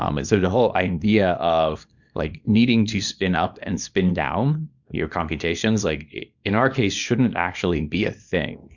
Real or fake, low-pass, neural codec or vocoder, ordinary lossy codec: fake; 7.2 kHz; codec, 24 kHz, 0.9 kbps, WavTokenizer, small release; MP3, 64 kbps